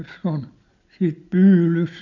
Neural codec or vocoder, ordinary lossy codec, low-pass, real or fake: none; none; 7.2 kHz; real